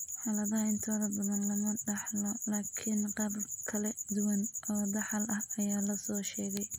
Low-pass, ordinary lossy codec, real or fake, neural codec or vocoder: none; none; real; none